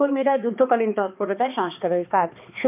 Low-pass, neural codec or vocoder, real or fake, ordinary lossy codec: 3.6 kHz; codec, 16 kHz, 2 kbps, X-Codec, HuBERT features, trained on balanced general audio; fake; none